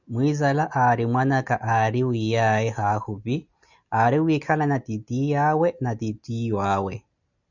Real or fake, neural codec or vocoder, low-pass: real; none; 7.2 kHz